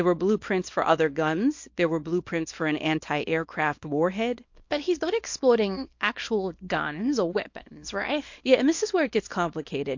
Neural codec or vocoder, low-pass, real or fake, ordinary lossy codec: codec, 24 kHz, 0.9 kbps, WavTokenizer, small release; 7.2 kHz; fake; MP3, 48 kbps